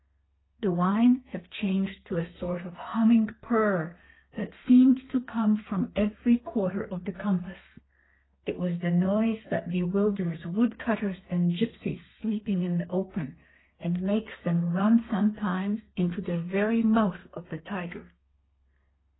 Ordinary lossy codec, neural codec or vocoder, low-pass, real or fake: AAC, 16 kbps; codec, 32 kHz, 1.9 kbps, SNAC; 7.2 kHz; fake